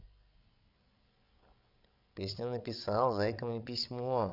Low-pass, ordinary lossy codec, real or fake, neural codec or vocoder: 5.4 kHz; none; fake; codec, 16 kHz, 16 kbps, FreqCodec, larger model